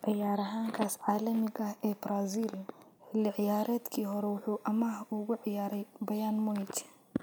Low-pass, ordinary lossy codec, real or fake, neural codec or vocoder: none; none; real; none